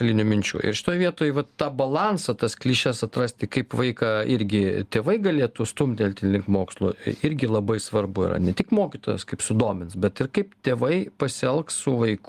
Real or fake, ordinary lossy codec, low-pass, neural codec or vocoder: real; Opus, 24 kbps; 14.4 kHz; none